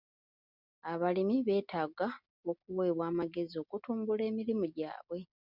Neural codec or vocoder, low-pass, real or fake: none; 5.4 kHz; real